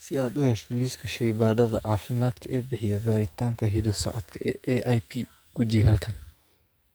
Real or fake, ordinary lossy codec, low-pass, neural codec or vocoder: fake; none; none; codec, 44.1 kHz, 2.6 kbps, SNAC